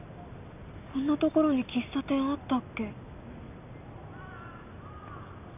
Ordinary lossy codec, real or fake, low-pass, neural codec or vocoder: none; real; 3.6 kHz; none